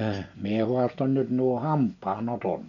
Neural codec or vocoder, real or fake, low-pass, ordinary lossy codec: none; real; 7.2 kHz; none